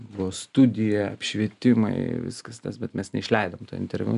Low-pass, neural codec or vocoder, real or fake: 10.8 kHz; none; real